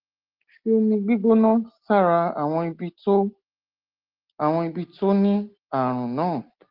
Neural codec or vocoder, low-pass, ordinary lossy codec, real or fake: none; 5.4 kHz; Opus, 16 kbps; real